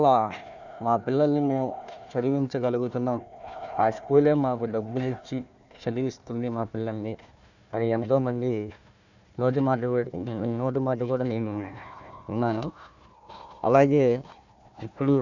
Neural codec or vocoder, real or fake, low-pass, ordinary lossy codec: codec, 16 kHz, 1 kbps, FunCodec, trained on Chinese and English, 50 frames a second; fake; 7.2 kHz; none